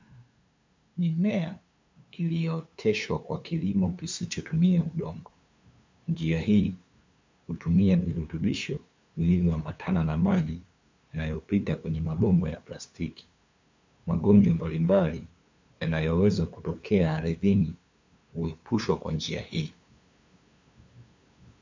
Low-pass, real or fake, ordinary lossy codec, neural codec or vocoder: 7.2 kHz; fake; MP3, 64 kbps; codec, 16 kHz, 2 kbps, FunCodec, trained on LibriTTS, 25 frames a second